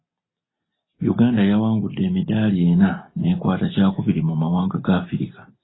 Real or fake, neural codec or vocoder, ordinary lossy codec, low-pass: real; none; AAC, 16 kbps; 7.2 kHz